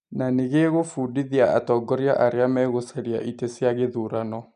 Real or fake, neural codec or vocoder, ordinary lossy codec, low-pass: real; none; none; 9.9 kHz